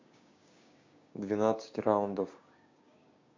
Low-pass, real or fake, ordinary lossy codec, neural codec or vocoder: 7.2 kHz; fake; MP3, 48 kbps; codec, 16 kHz, 6 kbps, DAC